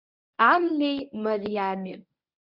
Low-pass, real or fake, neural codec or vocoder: 5.4 kHz; fake; codec, 24 kHz, 0.9 kbps, WavTokenizer, medium speech release version 1